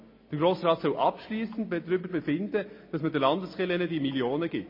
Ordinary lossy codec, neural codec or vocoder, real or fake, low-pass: MP3, 24 kbps; none; real; 5.4 kHz